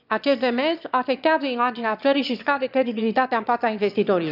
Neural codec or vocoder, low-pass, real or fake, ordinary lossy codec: autoencoder, 22.05 kHz, a latent of 192 numbers a frame, VITS, trained on one speaker; 5.4 kHz; fake; none